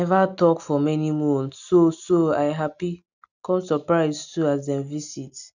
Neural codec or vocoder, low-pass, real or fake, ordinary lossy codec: none; 7.2 kHz; real; none